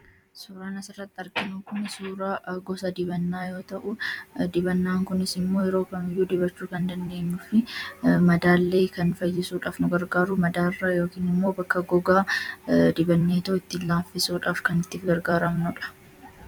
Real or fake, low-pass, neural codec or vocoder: fake; 19.8 kHz; vocoder, 44.1 kHz, 128 mel bands every 256 samples, BigVGAN v2